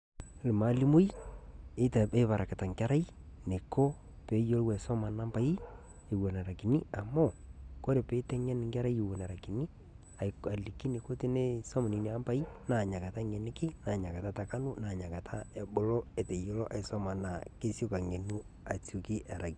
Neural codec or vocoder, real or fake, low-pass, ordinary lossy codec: none; real; 9.9 kHz; Opus, 64 kbps